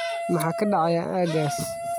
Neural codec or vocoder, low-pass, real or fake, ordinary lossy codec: none; none; real; none